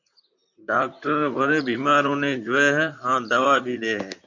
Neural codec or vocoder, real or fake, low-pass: vocoder, 44.1 kHz, 128 mel bands, Pupu-Vocoder; fake; 7.2 kHz